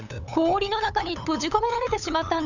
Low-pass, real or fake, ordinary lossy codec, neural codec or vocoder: 7.2 kHz; fake; none; codec, 16 kHz, 8 kbps, FunCodec, trained on LibriTTS, 25 frames a second